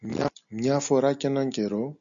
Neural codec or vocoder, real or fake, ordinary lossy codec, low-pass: none; real; MP3, 96 kbps; 7.2 kHz